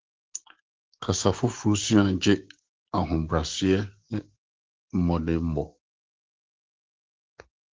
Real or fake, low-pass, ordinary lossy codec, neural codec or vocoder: fake; 7.2 kHz; Opus, 16 kbps; codec, 16 kHz, 6 kbps, DAC